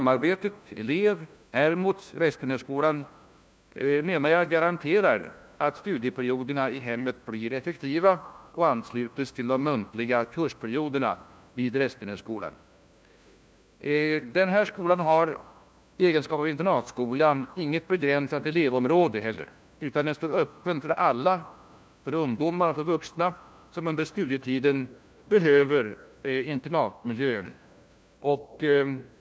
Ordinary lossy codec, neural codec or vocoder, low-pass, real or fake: none; codec, 16 kHz, 1 kbps, FunCodec, trained on LibriTTS, 50 frames a second; none; fake